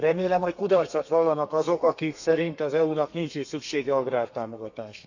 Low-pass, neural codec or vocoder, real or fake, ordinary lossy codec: 7.2 kHz; codec, 32 kHz, 1.9 kbps, SNAC; fake; none